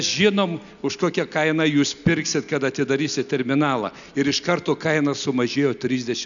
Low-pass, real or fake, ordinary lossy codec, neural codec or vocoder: 7.2 kHz; real; MP3, 96 kbps; none